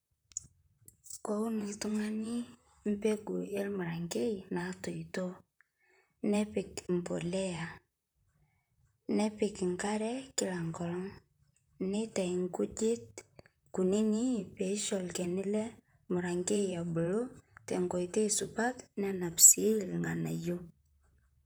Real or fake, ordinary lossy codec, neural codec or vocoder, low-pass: fake; none; vocoder, 44.1 kHz, 128 mel bands, Pupu-Vocoder; none